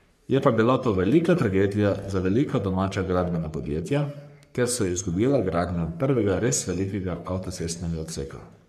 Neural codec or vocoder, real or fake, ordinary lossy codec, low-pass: codec, 44.1 kHz, 3.4 kbps, Pupu-Codec; fake; MP3, 96 kbps; 14.4 kHz